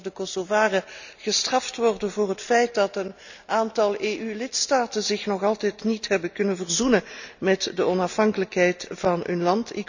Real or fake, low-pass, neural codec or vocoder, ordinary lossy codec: real; 7.2 kHz; none; none